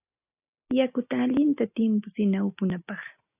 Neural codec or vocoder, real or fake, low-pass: none; real; 3.6 kHz